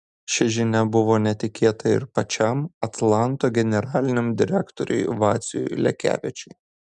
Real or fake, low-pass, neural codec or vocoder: real; 10.8 kHz; none